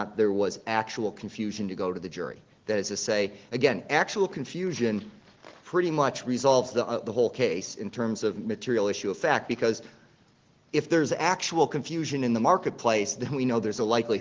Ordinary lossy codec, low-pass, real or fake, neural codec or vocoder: Opus, 16 kbps; 7.2 kHz; real; none